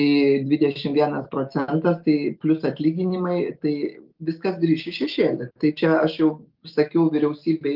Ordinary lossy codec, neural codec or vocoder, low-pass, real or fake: Opus, 32 kbps; none; 5.4 kHz; real